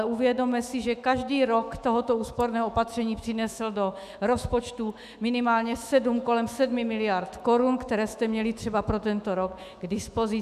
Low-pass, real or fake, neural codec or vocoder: 14.4 kHz; fake; autoencoder, 48 kHz, 128 numbers a frame, DAC-VAE, trained on Japanese speech